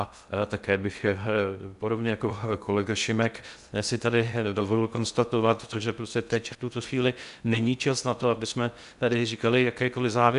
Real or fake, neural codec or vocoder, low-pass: fake; codec, 16 kHz in and 24 kHz out, 0.6 kbps, FocalCodec, streaming, 2048 codes; 10.8 kHz